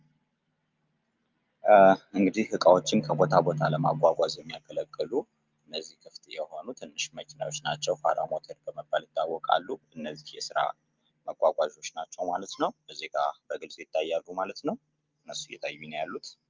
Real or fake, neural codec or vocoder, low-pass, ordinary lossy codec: real; none; 7.2 kHz; Opus, 24 kbps